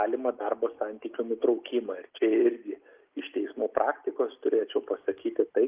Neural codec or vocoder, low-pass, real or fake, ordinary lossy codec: none; 3.6 kHz; real; Opus, 32 kbps